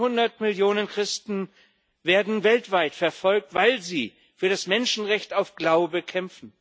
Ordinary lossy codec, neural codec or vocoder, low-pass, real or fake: none; none; none; real